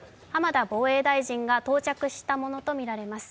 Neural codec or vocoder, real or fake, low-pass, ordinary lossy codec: none; real; none; none